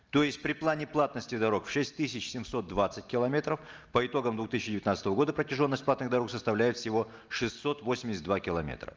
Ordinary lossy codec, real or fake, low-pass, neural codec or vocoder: Opus, 24 kbps; real; 7.2 kHz; none